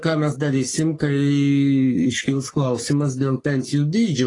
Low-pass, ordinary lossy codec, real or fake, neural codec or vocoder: 10.8 kHz; AAC, 32 kbps; fake; codec, 44.1 kHz, 3.4 kbps, Pupu-Codec